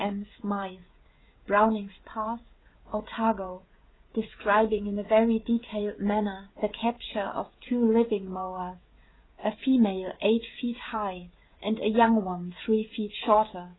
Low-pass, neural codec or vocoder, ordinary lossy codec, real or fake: 7.2 kHz; codec, 16 kHz, 16 kbps, FreqCodec, smaller model; AAC, 16 kbps; fake